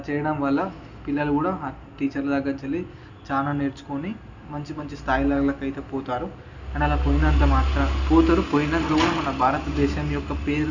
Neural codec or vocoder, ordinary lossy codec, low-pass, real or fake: none; none; 7.2 kHz; real